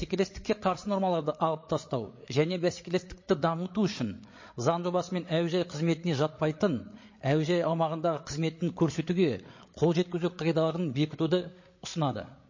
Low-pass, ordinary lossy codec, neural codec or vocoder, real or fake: 7.2 kHz; MP3, 32 kbps; codec, 16 kHz, 8 kbps, FreqCodec, larger model; fake